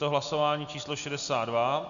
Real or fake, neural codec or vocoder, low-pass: real; none; 7.2 kHz